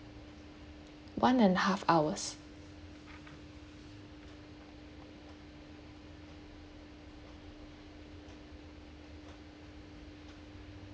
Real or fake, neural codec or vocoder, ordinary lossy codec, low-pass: real; none; none; none